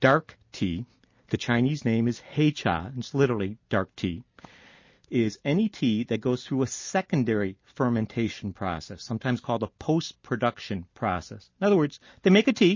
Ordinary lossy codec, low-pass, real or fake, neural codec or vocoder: MP3, 32 kbps; 7.2 kHz; real; none